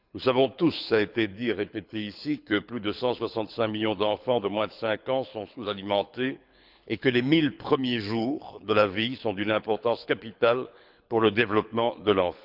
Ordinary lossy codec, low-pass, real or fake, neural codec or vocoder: none; 5.4 kHz; fake; codec, 24 kHz, 6 kbps, HILCodec